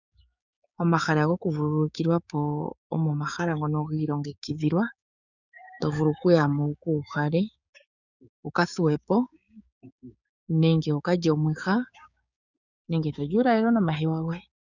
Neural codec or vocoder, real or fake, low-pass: codec, 16 kHz, 6 kbps, DAC; fake; 7.2 kHz